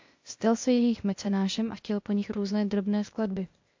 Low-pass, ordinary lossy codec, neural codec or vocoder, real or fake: 7.2 kHz; MP3, 48 kbps; codec, 16 kHz, 0.8 kbps, ZipCodec; fake